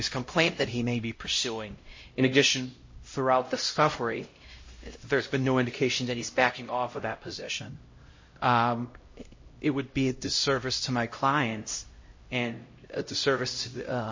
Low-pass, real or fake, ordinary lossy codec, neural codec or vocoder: 7.2 kHz; fake; MP3, 32 kbps; codec, 16 kHz, 0.5 kbps, X-Codec, HuBERT features, trained on LibriSpeech